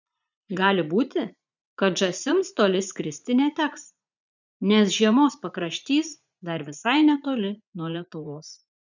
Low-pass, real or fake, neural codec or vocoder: 7.2 kHz; fake; vocoder, 44.1 kHz, 80 mel bands, Vocos